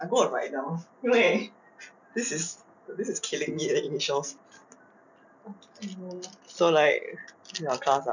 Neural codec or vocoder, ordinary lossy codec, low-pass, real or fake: none; none; 7.2 kHz; real